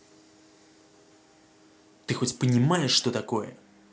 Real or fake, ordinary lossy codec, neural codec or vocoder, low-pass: real; none; none; none